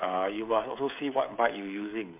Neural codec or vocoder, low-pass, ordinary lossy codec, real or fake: codec, 16 kHz, 16 kbps, FreqCodec, smaller model; 3.6 kHz; MP3, 32 kbps; fake